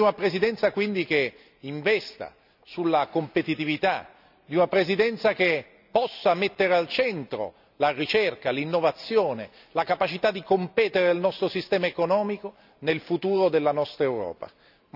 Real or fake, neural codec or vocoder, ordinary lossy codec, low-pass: real; none; none; 5.4 kHz